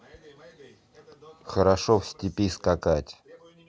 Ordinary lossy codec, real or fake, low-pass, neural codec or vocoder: none; real; none; none